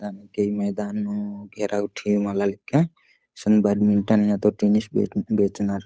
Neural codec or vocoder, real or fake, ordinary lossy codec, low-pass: codec, 16 kHz, 8 kbps, FunCodec, trained on Chinese and English, 25 frames a second; fake; none; none